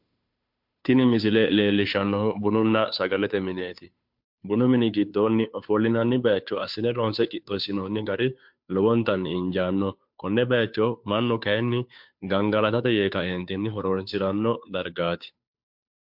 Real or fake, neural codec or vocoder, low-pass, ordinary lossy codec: fake; codec, 16 kHz, 2 kbps, FunCodec, trained on Chinese and English, 25 frames a second; 5.4 kHz; MP3, 48 kbps